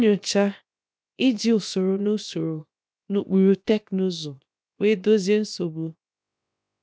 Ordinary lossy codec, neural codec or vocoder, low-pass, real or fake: none; codec, 16 kHz, 0.7 kbps, FocalCodec; none; fake